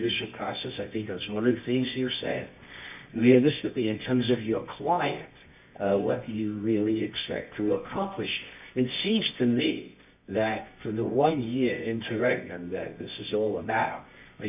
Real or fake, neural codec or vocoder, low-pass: fake; codec, 24 kHz, 0.9 kbps, WavTokenizer, medium music audio release; 3.6 kHz